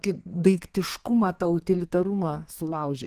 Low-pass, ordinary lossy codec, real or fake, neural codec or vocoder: 14.4 kHz; Opus, 24 kbps; fake; codec, 32 kHz, 1.9 kbps, SNAC